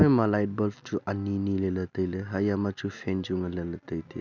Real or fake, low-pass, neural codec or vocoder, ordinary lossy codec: real; 7.2 kHz; none; none